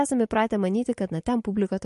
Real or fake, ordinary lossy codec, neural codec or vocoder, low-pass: real; MP3, 48 kbps; none; 14.4 kHz